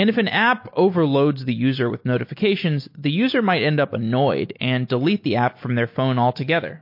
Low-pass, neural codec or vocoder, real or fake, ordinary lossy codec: 5.4 kHz; none; real; MP3, 32 kbps